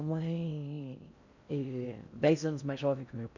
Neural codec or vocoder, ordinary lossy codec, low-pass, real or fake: codec, 16 kHz in and 24 kHz out, 0.6 kbps, FocalCodec, streaming, 4096 codes; none; 7.2 kHz; fake